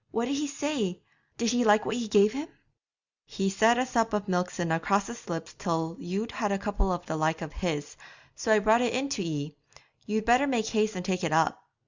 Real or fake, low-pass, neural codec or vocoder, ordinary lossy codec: real; 7.2 kHz; none; Opus, 64 kbps